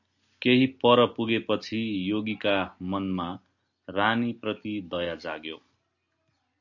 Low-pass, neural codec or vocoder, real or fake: 7.2 kHz; none; real